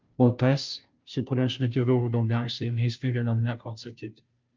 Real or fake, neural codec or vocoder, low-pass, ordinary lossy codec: fake; codec, 16 kHz, 0.5 kbps, FunCodec, trained on Chinese and English, 25 frames a second; 7.2 kHz; Opus, 24 kbps